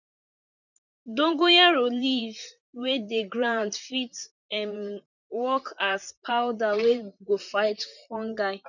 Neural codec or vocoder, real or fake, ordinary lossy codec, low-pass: vocoder, 44.1 kHz, 128 mel bands, Pupu-Vocoder; fake; none; 7.2 kHz